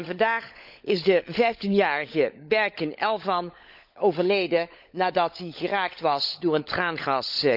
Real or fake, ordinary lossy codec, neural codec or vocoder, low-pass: fake; none; codec, 16 kHz, 16 kbps, FunCodec, trained on LibriTTS, 50 frames a second; 5.4 kHz